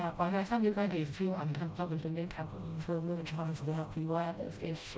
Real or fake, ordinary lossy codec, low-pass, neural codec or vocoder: fake; none; none; codec, 16 kHz, 0.5 kbps, FreqCodec, smaller model